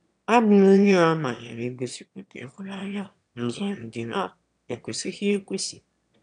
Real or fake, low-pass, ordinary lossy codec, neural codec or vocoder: fake; 9.9 kHz; none; autoencoder, 22.05 kHz, a latent of 192 numbers a frame, VITS, trained on one speaker